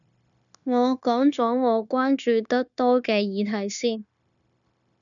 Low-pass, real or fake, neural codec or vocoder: 7.2 kHz; fake; codec, 16 kHz, 0.9 kbps, LongCat-Audio-Codec